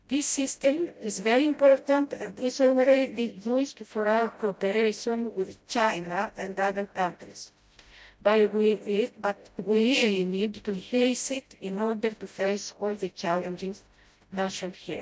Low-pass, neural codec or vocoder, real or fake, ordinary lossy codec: none; codec, 16 kHz, 0.5 kbps, FreqCodec, smaller model; fake; none